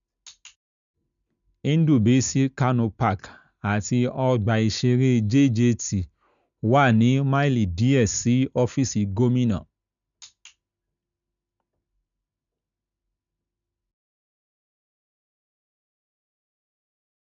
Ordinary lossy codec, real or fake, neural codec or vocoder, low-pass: none; real; none; 7.2 kHz